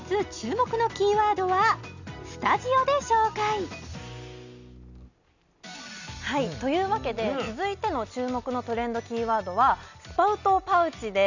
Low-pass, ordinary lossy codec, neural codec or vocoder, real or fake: 7.2 kHz; none; none; real